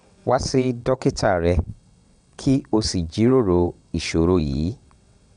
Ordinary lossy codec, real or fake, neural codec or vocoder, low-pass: none; fake; vocoder, 22.05 kHz, 80 mel bands, WaveNeXt; 9.9 kHz